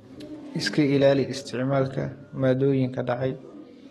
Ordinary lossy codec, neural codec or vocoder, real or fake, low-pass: AAC, 32 kbps; codec, 44.1 kHz, 7.8 kbps, DAC; fake; 19.8 kHz